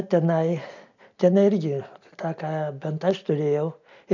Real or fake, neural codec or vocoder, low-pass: real; none; 7.2 kHz